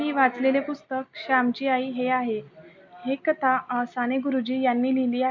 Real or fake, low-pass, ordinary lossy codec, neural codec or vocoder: real; 7.2 kHz; MP3, 64 kbps; none